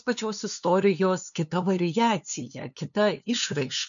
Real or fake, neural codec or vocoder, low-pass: fake; codec, 16 kHz, 2 kbps, FunCodec, trained on LibriTTS, 25 frames a second; 7.2 kHz